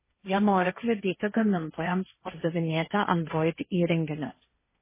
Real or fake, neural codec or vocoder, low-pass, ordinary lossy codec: fake; codec, 16 kHz, 1.1 kbps, Voila-Tokenizer; 3.6 kHz; MP3, 16 kbps